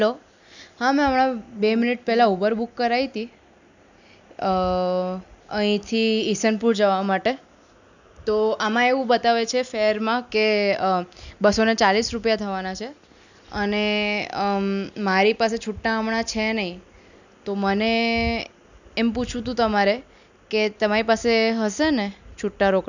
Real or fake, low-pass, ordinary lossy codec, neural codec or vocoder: real; 7.2 kHz; none; none